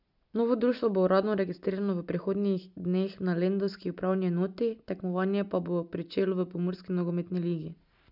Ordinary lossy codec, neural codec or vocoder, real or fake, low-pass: none; none; real; 5.4 kHz